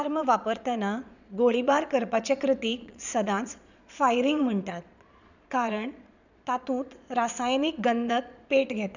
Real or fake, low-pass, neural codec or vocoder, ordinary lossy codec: fake; 7.2 kHz; vocoder, 22.05 kHz, 80 mel bands, Vocos; none